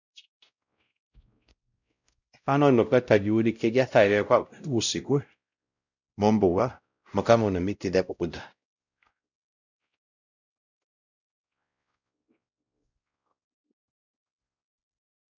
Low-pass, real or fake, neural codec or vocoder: 7.2 kHz; fake; codec, 16 kHz, 0.5 kbps, X-Codec, WavLM features, trained on Multilingual LibriSpeech